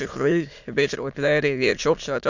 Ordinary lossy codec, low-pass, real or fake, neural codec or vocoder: none; 7.2 kHz; fake; autoencoder, 22.05 kHz, a latent of 192 numbers a frame, VITS, trained on many speakers